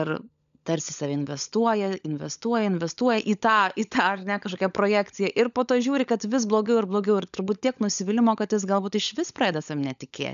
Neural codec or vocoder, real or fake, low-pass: codec, 16 kHz, 16 kbps, FunCodec, trained on LibriTTS, 50 frames a second; fake; 7.2 kHz